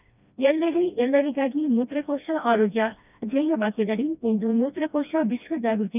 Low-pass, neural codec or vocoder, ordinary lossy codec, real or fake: 3.6 kHz; codec, 16 kHz, 1 kbps, FreqCodec, smaller model; none; fake